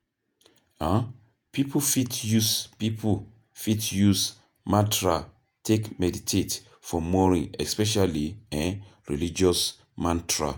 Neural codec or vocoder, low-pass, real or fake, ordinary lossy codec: vocoder, 48 kHz, 128 mel bands, Vocos; none; fake; none